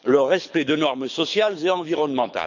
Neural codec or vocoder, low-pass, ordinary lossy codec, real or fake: codec, 24 kHz, 6 kbps, HILCodec; 7.2 kHz; none; fake